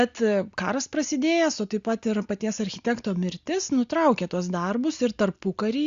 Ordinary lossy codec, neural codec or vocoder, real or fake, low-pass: Opus, 64 kbps; none; real; 7.2 kHz